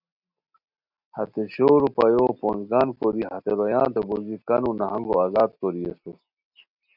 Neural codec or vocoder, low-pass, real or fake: none; 5.4 kHz; real